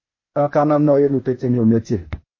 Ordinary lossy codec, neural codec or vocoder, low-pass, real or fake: MP3, 32 kbps; codec, 16 kHz, 0.8 kbps, ZipCodec; 7.2 kHz; fake